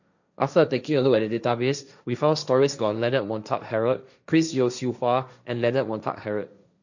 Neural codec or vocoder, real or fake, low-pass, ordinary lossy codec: codec, 16 kHz, 1.1 kbps, Voila-Tokenizer; fake; 7.2 kHz; none